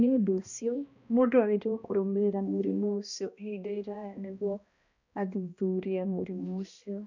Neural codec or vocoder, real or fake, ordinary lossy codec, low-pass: codec, 16 kHz, 1 kbps, X-Codec, HuBERT features, trained on balanced general audio; fake; none; 7.2 kHz